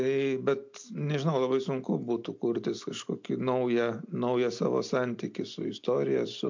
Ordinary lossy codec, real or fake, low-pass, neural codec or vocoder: MP3, 48 kbps; real; 7.2 kHz; none